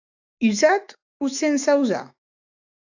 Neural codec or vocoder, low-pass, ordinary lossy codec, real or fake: autoencoder, 48 kHz, 128 numbers a frame, DAC-VAE, trained on Japanese speech; 7.2 kHz; AAC, 48 kbps; fake